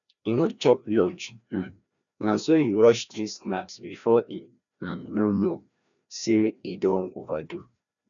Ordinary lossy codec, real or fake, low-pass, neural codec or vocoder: none; fake; 7.2 kHz; codec, 16 kHz, 1 kbps, FreqCodec, larger model